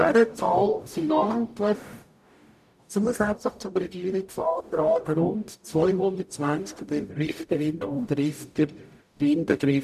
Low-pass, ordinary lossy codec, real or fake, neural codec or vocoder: 14.4 kHz; none; fake; codec, 44.1 kHz, 0.9 kbps, DAC